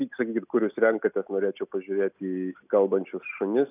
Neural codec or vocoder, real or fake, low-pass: none; real; 3.6 kHz